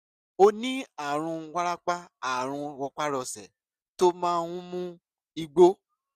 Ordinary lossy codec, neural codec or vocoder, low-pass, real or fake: none; none; 14.4 kHz; real